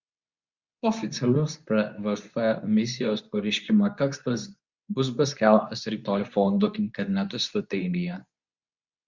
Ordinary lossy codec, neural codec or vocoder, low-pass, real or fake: Opus, 64 kbps; codec, 24 kHz, 0.9 kbps, WavTokenizer, medium speech release version 2; 7.2 kHz; fake